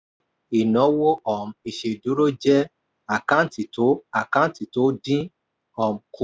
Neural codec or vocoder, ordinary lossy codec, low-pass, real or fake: none; none; none; real